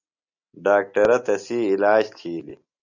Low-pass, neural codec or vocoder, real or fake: 7.2 kHz; none; real